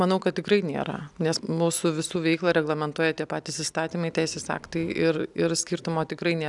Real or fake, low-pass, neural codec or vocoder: real; 10.8 kHz; none